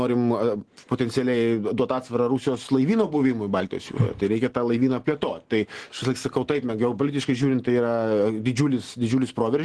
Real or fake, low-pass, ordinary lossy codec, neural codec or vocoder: real; 10.8 kHz; Opus, 16 kbps; none